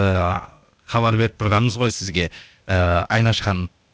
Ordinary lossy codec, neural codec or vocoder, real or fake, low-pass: none; codec, 16 kHz, 0.8 kbps, ZipCodec; fake; none